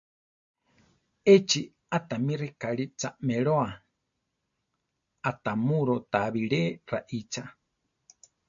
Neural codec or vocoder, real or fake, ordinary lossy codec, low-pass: none; real; MP3, 48 kbps; 7.2 kHz